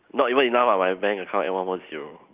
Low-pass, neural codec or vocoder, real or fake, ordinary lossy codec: 3.6 kHz; none; real; Opus, 24 kbps